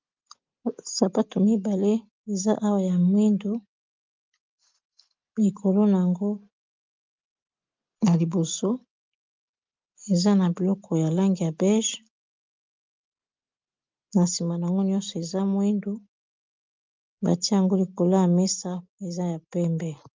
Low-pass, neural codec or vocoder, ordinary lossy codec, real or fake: 7.2 kHz; none; Opus, 24 kbps; real